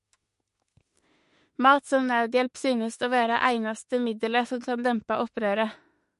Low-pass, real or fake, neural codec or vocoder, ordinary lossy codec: 14.4 kHz; fake; autoencoder, 48 kHz, 32 numbers a frame, DAC-VAE, trained on Japanese speech; MP3, 48 kbps